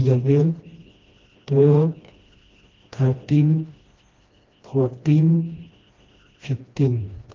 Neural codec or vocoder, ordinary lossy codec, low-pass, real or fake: codec, 16 kHz, 1 kbps, FreqCodec, smaller model; Opus, 24 kbps; 7.2 kHz; fake